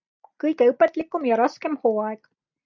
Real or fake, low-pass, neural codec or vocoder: real; 7.2 kHz; none